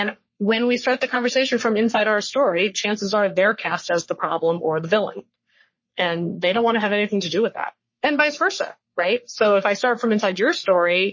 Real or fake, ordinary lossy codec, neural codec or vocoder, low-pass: fake; MP3, 32 kbps; codec, 44.1 kHz, 3.4 kbps, Pupu-Codec; 7.2 kHz